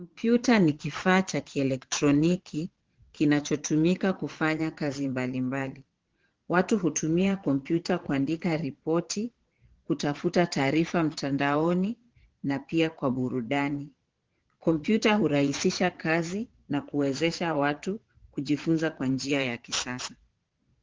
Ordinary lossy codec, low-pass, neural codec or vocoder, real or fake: Opus, 16 kbps; 7.2 kHz; vocoder, 22.05 kHz, 80 mel bands, Vocos; fake